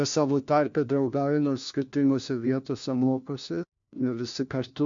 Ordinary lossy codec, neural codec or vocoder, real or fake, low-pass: MP3, 64 kbps; codec, 16 kHz, 1 kbps, FunCodec, trained on LibriTTS, 50 frames a second; fake; 7.2 kHz